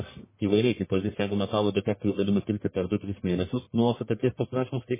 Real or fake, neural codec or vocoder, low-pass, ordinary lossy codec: fake; codec, 44.1 kHz, 1.7 kbps, Pupu-Codec; 3.6 kHz; MP3, 16 kbps